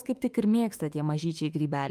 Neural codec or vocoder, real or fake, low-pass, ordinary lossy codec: autoencoder, 48 kHz, 32 numbers a frame, DAC-VAE, trained on Japanese speech; fake; 14.4 kHz; Opus, 32 kbps